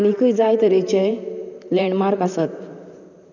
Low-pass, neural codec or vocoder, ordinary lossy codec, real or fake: 7.2 kHz; vocoder, 44.1 kHz, 128 mel bands, Pupu-Vocoder; none; fake